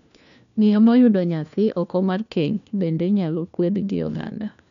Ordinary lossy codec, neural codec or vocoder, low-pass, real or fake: none; codec, 16 kHz, 1 kbps, FunCodec, trained on LibriTTS, 50 frames a second; 7.2 kHz; fake